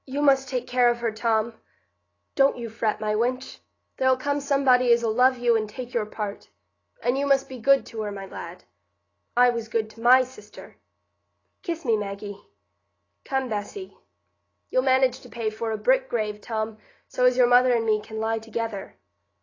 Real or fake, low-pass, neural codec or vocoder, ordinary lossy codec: real; 7.2 kHz; none; AAC, 32 kbps